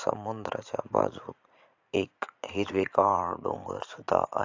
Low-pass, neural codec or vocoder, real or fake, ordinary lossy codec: 7.2 kHz; vocoder, 44.1 kHz, 80 mel bands, Vocos; fake; AAC, 48 kbps